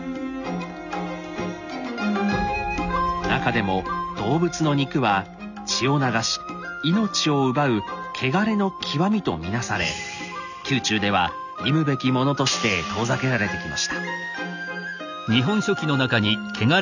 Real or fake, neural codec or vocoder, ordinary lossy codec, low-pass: real; none; none; 7.2 kHz